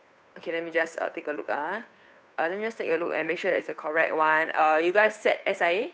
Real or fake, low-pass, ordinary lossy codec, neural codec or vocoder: fake; none; none; codec, 16 kHz, 2 kbps, FunCodec, trained on Chinese and English, 25 frames a second